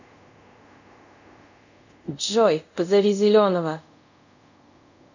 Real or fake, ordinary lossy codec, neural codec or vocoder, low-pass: fake; none; codec, 24 kHz, 0.5 kbps, DualCodec; 7.2 kHz